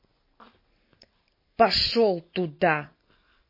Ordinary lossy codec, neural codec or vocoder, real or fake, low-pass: MP3, 24 kbps; none; real; 5.4 kHz